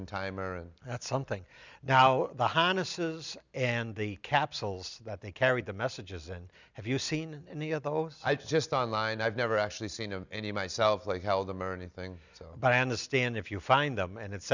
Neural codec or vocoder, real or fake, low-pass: none; real; 7.2 kHz